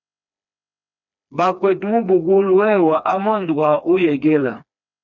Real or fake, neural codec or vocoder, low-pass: fake; codec, 16 kHz, 2 kbps, FreqCodec, smaller model; 7.2 kHz